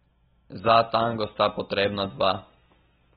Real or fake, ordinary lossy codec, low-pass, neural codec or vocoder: real; AAC, 16 kbps; 19.8 kHz; none